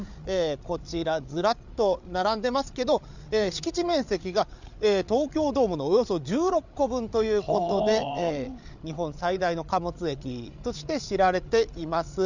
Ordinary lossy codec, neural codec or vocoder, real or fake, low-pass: none; codec, 16 kHz, 8 kbps, FreqCodec, larger model; fake; 7.2 kHz